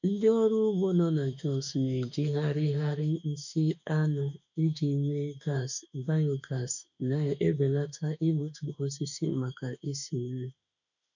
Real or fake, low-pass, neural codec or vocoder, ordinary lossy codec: fake; 7.2 kHz; autoencoder, 48 kHz, 32 numbers a frame, DAC-VAE, trained on Japanese speech; none